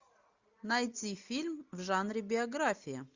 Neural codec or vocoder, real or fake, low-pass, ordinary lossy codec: none; real; 7.2 kHz; Opus, 64 kbps